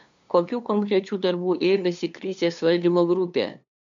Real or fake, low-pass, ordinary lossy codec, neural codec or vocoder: fake; 7.2 kHz; MP3, 64 kbps; codec, 16 kHz, 2 kbps, FunCodec, trained on LibriTTS, 25 frames a second